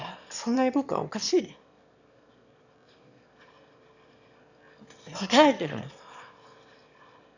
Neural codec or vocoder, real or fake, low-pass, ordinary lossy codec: autoencoder, 22.05 kHz, a latent of 192 numbers a frame, VITS, trained on one speaker; fake; 7.2 kHz; none